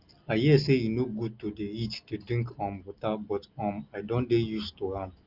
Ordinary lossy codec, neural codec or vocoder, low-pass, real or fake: Opus, 32 kbps; none; 5.4 kHz; real